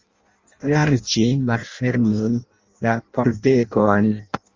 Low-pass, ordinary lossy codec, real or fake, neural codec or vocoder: 7.2 kHz; Opus, 32 kbps; fake; codec, 16 kHz in and 24 kHz out, 0.6 kbps, FireRedTTS-2 codec